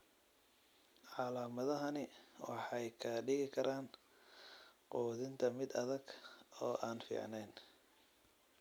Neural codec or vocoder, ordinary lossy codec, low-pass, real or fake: none; none; none; real